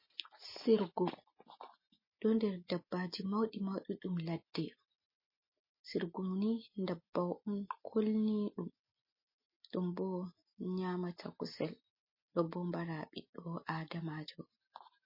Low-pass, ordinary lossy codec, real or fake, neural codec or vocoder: 5.4 kHz; MP3, 24 kbps; real; none